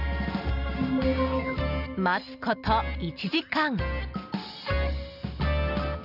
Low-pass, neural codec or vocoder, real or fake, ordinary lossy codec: 5.4 kHz; none; real; none